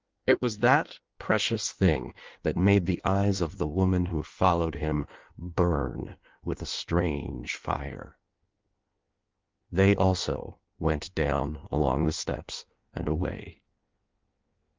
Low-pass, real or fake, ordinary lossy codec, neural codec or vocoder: 7.2 kHz; fake; Opus, 32 kbps; codec, 16 kHz in and 24 kHz out, 1.1 kbps, FireRedTTS-2 codec